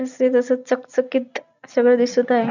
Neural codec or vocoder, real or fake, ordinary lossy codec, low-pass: none; real; none; 7.2 kHz